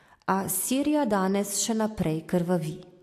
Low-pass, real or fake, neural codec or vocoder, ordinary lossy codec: 14.4 kHz; real; none; AAC, 64 kbps